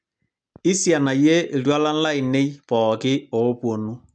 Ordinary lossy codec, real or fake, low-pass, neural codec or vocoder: none; real; 9.9 kHz; none